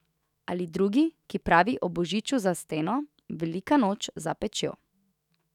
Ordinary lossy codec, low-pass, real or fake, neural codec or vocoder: none; 19.8 kHz; fake; autoencoder, 48 kHz, 128 numbers a frame, DAC-VAE, trained on Japanese speech